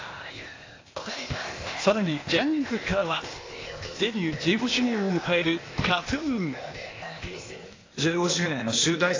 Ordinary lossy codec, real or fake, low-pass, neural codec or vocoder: AAC, 32 kbps; fake; 7.2 kHz; codec, 16 kHz, 0.8 kbps, ZipCodec